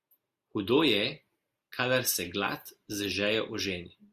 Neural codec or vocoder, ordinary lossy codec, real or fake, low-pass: none; Opus, 64 kbps; real; 14.4 kHz